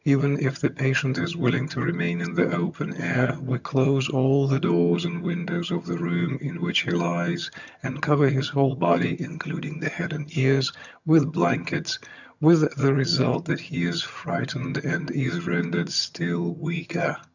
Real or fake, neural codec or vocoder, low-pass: fake; vocoder, 22.05 kHz, 80 mel bands, HiFi-GAN; 7.2 kHz